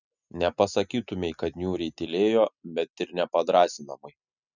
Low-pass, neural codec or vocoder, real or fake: 7.2 kHz; none; real